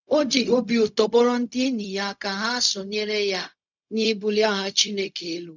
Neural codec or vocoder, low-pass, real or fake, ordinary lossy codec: codec, 16 kHz, 0.4 kbps, LongCat-Audio-Codec; 7.2 kHz; fake; none